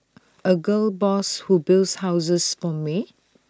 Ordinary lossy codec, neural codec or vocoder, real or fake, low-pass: none; none; real; none